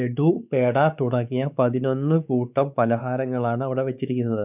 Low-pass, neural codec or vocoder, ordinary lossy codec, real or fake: 3.6 kHz; codec, 16 kHz, 4 kbps, X-Codec, WavLM features, trained on Multilingual LibriSpeech; none; fake